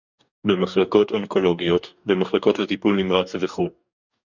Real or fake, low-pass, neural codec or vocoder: fake; 7.2 kHz; codec, 44.1 kHz, 2.6 kbps, DAC